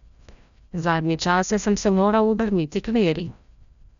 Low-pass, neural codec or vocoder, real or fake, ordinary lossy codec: 7.2 kHz; codec, 16 kHz, 0.5 kbps, FreqCodec, larger model; fake; none